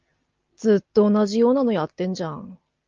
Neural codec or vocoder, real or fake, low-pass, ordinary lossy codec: none; real; 7.2 kHz; Opus, 24 kbps